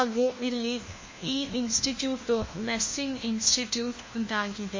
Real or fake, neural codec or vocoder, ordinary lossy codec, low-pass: fake; codec, 16 kHz, 1 kbps, FunCodec, trained on LibriTTS, 50 frames a second; MP3, 32 kbps; 7.2 kHz